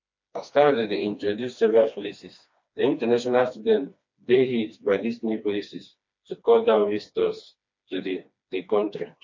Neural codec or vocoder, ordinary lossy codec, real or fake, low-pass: codec, 16 kHz, 2 kbps, FreqCodec, smaller model; MP3, 48 kbps; fake; 7.2 kHz